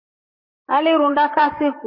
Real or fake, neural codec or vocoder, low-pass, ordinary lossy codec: fake; vocoder, 44.1 kHz, 128 mel bands, Pupu-Vocoder; 5.4 kHz; MP3, 24 kbps